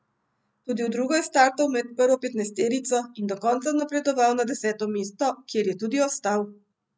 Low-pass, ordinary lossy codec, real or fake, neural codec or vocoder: none; none; real; none